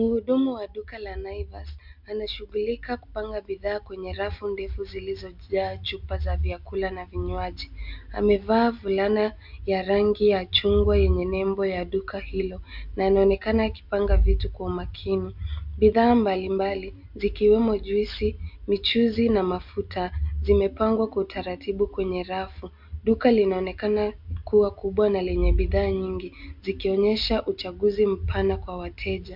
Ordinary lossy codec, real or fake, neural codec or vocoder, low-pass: MP3, 48 kbps; real; none; 5.4 kHz